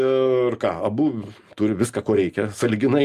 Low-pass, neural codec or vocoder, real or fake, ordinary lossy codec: 14.4 kHz; none; real; Opus, 32 kbps